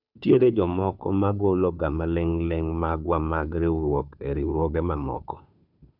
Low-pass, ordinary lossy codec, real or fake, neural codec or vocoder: 5.4 kHz; none; fake; codec, 16 kHz, 2 kbps, FunCodec, trained on Chinese and English, 25 frames a second